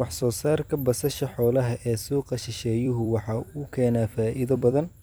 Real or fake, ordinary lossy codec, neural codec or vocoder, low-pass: fake; none; vocoder, 44.1 kHz, 128 mel bands every 256 samples, BigVGAN v2; none